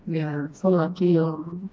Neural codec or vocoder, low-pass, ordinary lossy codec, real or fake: codec, 16 kHz, 1 kbps, FreqCodec, smaller model; none; none; fake